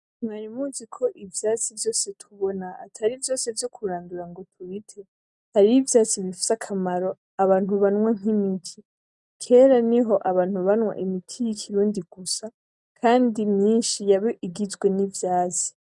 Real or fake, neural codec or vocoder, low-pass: real; none; 10.8 kHz